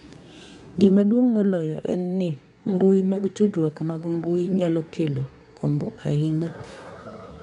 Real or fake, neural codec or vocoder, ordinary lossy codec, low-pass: fake; codec, 24 kHz, 1 kbps, SNAC; MP3, 96 kbps; 10.8 kHz